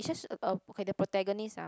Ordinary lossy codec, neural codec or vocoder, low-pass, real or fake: none; none; none; real